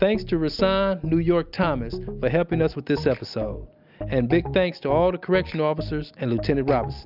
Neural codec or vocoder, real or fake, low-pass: none; real; 5.4 kHz